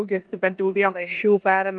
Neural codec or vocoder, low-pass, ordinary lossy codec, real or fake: codec, 16 kHz in and 24 kHz out, 0.9 kbps, LongCat-Audio-Codec, four codebook decoder; 9.9 kHz; Opus, 32 kbps; fake